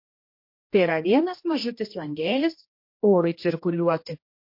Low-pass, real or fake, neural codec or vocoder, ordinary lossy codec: 5.4 kHz; fake; codec, 16 kHz, 1 kbps, X-Codec, HuBERT features, trained on general audio; MP3, 32 kbps